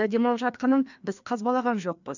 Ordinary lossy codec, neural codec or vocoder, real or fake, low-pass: none; codec, 16 kHz, 2 kbps, FreqCodec, larger model; fake; 7.2 kHz